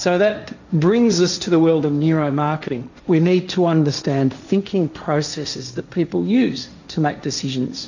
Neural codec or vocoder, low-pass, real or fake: codec, 16 kHz, 1.1 kbps, Voila-Tokenizer; 7.2 kHz; fake